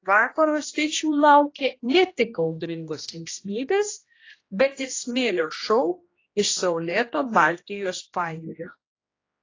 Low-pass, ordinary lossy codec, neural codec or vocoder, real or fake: 7.2 kHz; AAC, 32 kbps; codec, 16 kHz, 1 kbps, X-Codec, HuBERT features, trained on general audio; fake